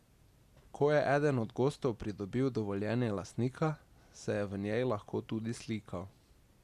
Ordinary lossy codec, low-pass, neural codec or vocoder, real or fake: Opus, 64 kbps; 14.4 kHz; none; real